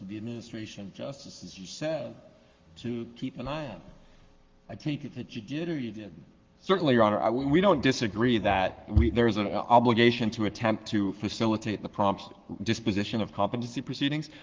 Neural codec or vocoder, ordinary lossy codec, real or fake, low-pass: codec, 44.1 kHz, 7.8 kbps, Pupu-Codec; Opus, 32 kbps; fake; 7.2 kHz